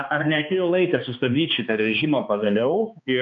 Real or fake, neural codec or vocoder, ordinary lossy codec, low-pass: fake; codec, 16 kHz, 2 kbps, X-Codec, HuBERT features, trained on balanced general audio; MP3, 64 kbps; 7.2 kHz